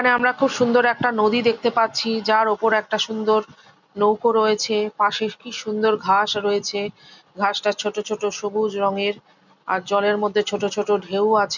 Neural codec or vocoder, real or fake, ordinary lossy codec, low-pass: none; real; none; 7.2 kHz